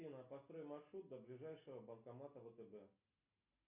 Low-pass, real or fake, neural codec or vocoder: 3.6 kHz; real; none